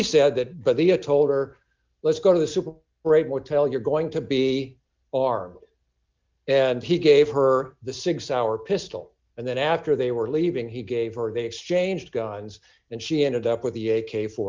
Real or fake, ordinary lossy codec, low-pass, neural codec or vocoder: real; Opus, 24 kbps; 7.2 kHz; none